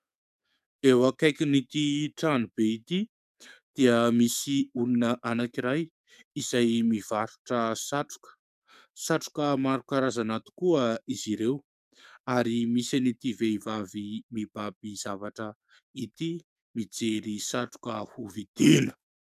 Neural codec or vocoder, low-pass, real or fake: codec, 44.1 kHz, 7.8 kbps, DAC; 14.4 kHz; fake